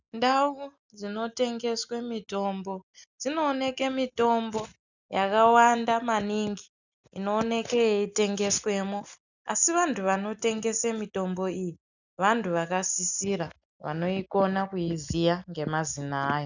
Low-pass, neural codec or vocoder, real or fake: 7.2 kHz; none; real